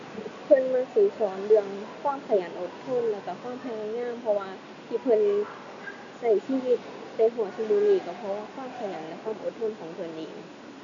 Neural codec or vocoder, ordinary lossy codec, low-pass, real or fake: none; none; 7.2 kHz; real